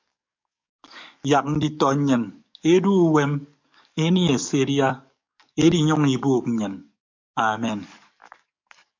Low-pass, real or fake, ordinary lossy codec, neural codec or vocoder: 7.2 kHz; fake; MP3, 64 kbps; codec, 44.1 kHz, 7.8 kbps, DAC